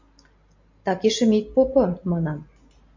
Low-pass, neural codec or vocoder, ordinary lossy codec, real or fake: 7.2 kHz; none; MP3, 48 kbps; real